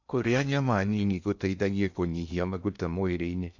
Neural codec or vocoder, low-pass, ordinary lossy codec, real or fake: codec, 16 kHz in and 24 kHz out, 0.6 kbps, FocalCodec, streaming, 2048 codes; 7.2 kHz; none; fake